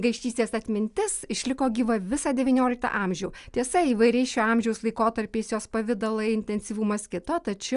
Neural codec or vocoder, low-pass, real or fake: none; 10.8 kHz; real